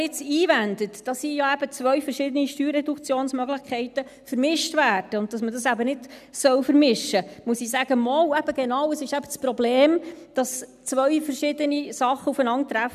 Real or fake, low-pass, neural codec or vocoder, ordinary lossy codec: real; 14.4 kHz; none; none